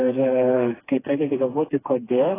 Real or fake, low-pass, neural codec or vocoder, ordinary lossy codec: fake; 3.6 kHz; codec, 16 kHz, 2 kbps, FreqCodec, smaller model; AAC, 16 kbps